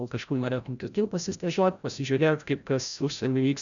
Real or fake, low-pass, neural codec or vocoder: fake; 7.2 kHz; codec, 16 kHz, 0.5 kbps, FreqCodec, larger model